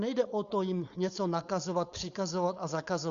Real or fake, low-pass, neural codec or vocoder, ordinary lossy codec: fake; 7.2 kHz; codec, 16 kHz, 4.8 kbps, FACodec; Opus, 64 kbps